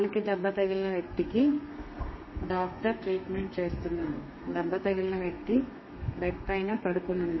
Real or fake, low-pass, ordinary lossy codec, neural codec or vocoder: fake; 7.2 kHz; MP3, 24 kbps; codec, 32 kHz, 1.9 kbps, SNAC